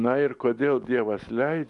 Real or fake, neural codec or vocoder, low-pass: real; none; 10.8 kHz